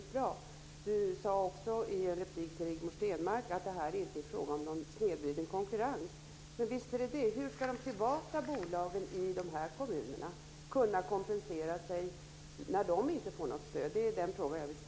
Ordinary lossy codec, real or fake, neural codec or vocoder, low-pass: none; real; none; none